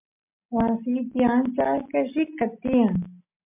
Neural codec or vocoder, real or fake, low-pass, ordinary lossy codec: none; real; 3.6 kHz; MP3, 32 kbps